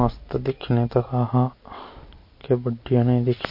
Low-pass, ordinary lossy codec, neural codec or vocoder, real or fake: 5.4 kHz; MP3, 32 kbps; none; real